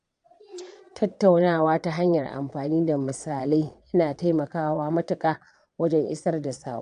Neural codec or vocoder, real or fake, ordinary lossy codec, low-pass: vocoder, 22.05 kHz, 80 mel bands, Vocos; fake; none; 9.9 kHz